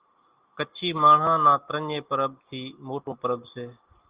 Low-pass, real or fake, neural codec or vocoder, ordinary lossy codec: 3.6 kHz; real; none; Opus, 32 kbps